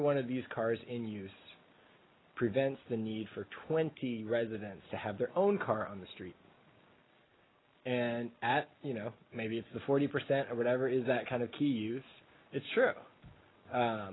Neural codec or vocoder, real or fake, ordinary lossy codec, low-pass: none; real; AAC, 16 kbps; 7.2 kHz